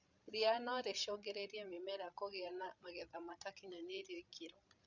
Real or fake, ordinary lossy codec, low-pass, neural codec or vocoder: fake; none; 7.2 kHz; codec, 16 kHz, 16 kbps, FreqCodec, larger model